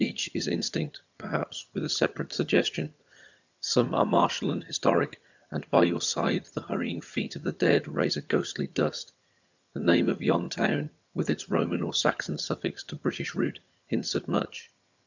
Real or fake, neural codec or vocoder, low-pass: fake; vocoder, 22.05 kHz, 80 mel bands, HiFi-GAN; 7.2 kHz